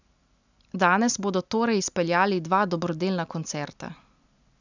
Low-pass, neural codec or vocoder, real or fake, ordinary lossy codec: 7.2 kHz; none; real; none